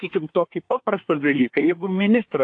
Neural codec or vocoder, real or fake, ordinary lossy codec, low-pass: codec, 24 kHz, 1 kbps, SNAC; fake; AAC, 48 kbps; 9.9 kHz